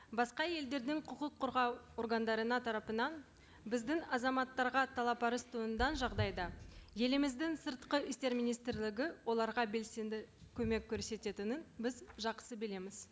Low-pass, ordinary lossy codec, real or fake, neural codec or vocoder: none; none; real; none